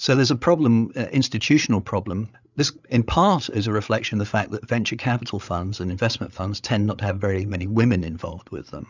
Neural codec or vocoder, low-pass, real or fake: codec, 16 kHz, 8 kbps, FreqCodec, larger model; 7.2 kHz; fake